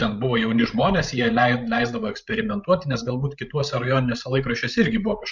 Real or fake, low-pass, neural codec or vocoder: fake; 7.2 kHz; codec, 16 kHz, 16 kbps, FreqCodec, larger model